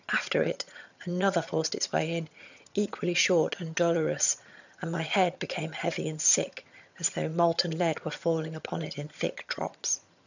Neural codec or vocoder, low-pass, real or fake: vocoder, 22.05 kHz, 80 mel bands, HiFi-GAN; 7.2 kHz; fake